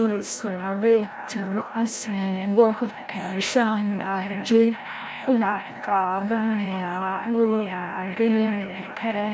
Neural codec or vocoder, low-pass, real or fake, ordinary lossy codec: codec, 16 kHz, 0.5 kbps, FreqCodec, larger model; none; fake; none